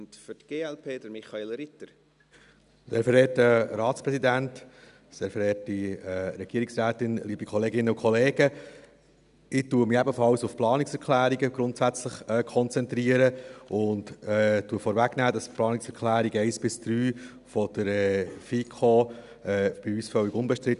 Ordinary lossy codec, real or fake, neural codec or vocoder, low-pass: none; real; none; 10.8 kHz